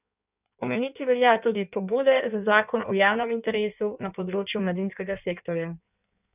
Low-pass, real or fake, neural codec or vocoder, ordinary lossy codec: 3.6 kHz; fake; codec, 16 kHz in and 24 kHz out, 1.1 kbps, FireRedTTS-2 codec; none